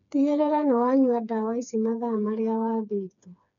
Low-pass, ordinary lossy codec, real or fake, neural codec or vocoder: 7.2 kHz; none; fake; codec, 16 kHz, 4 kbps, FreqCodec, smaller model